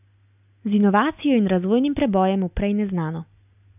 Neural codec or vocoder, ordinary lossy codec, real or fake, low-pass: none; none; real; 3.6 kHz